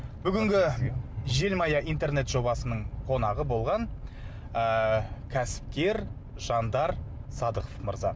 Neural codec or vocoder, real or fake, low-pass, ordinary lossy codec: none; real; none; none